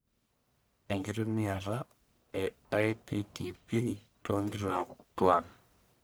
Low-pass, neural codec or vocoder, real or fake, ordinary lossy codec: none; codec, 44.1 kHz, 1.7 kbps, Pupu-Codec; fake; none